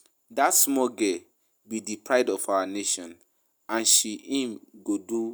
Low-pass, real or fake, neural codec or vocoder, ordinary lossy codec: none; real; none; none